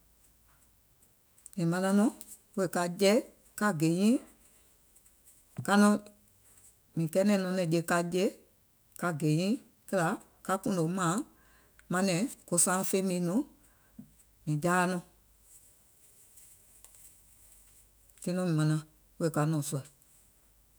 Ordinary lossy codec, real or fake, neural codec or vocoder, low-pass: none; fake; autoencoder, 48 kHz, 128 numbers a frame, DAC-VAE, trained on Japanese speech; none